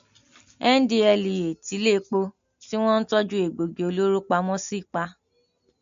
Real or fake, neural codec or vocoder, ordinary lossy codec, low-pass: real; none; MP3, 48 kbps; 7.2 kHz